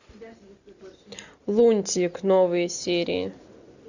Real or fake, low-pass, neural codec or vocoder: real; 7.2 kHz; none